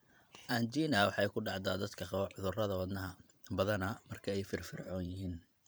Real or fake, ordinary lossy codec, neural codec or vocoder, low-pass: fake; none; vocoder, 44.1 kHz, 128 mel bands every 512 samples, BigVGAN v2; none